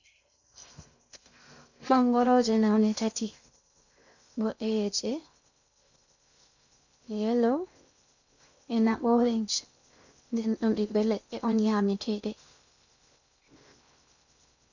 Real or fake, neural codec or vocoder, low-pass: fake; codec, 16 kHz in and 24 kHz out, 0.6 kbps, FocalCodec, streaming, 2048 codes; 7.2 kHz